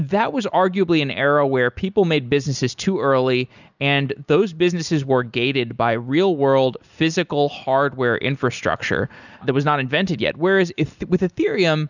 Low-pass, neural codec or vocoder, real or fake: 7.2 kHz; none; real